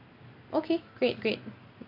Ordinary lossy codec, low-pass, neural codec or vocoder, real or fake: AAC, 32 kbps; 5.4 kHz; none; real